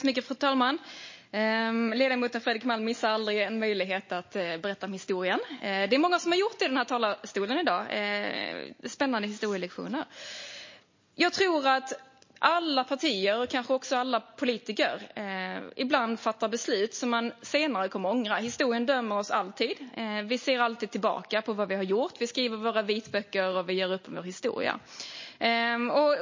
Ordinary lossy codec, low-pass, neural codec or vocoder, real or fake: MP3, 32 kbps; 7.2 kHz; none; real